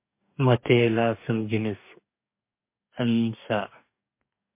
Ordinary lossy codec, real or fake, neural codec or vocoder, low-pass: MP3, 24 kbps; fake; codec, 44.1 kHz, 2.6 kbps, DAC; 3.6 kHz